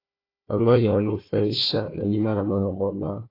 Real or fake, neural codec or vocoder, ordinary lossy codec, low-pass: fake; codec, 16 kHz, 1 kbps, FunCodec, trained on Chinese and English, 50 frames a second; AAC, 24 kbps; 5.4 kHz